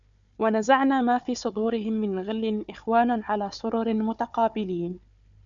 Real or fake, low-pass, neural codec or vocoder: fake; 7.2 kHz; codec, 16 kHz, 4 kbps, FunCodec, trained on Chinese and English, 50 frames a second